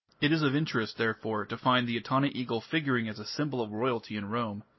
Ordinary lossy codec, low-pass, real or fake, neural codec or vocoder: MP3, 24 kbps; 7.2 kHz; real; none